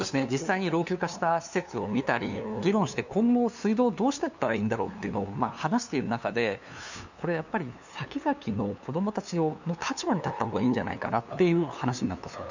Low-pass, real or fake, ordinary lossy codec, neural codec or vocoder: 7.2 kHz; fake; none; codec, 16 kHz, 2 kbps, FunCodec, trained on LibriTTS, 25 frames a second